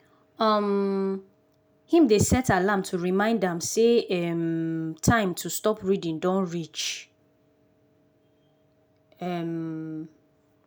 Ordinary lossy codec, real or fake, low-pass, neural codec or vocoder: none; real; none; none